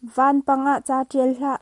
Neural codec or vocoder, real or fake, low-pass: none; real; 10.8 kHz